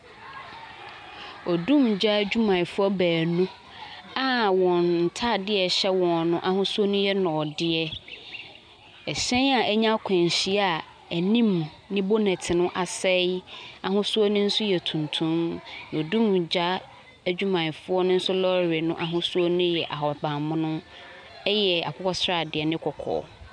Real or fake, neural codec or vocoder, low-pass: real; none; 9.9 kHz